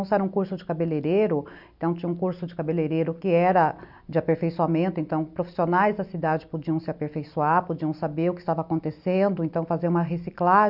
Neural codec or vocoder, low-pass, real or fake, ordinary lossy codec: none; 5.4 kHz; real; none